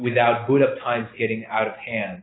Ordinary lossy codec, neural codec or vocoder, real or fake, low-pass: AAC, 16 kbps; none; real; 7.2 kHz